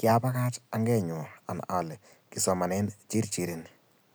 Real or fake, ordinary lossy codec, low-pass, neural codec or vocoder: real; none; none; none